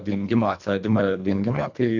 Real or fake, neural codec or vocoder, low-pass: fake; codec, 24 kHz, 1.5 kbps, HILCodec; 7.2 kHz